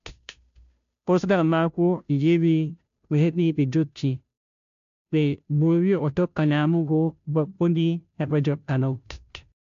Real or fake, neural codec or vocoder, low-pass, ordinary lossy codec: fake; codec, 16 kHz, 0.5 kbps, FunCodec, trained on Chinese and English, 25 frames a second; 7.2 kHz; AAC, 64 kbps